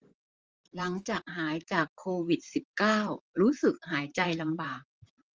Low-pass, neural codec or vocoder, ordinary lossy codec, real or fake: 7.2 kHz; vocoder, 44.1 kHz, 128 mel bands, Pupu-Vocoder; Opus, 24 kbps; fake